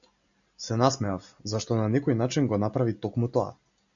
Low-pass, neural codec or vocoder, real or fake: 7.2 kHz; none; real